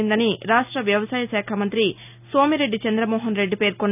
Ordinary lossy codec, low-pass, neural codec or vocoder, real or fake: none; 3.6 kHz; none; real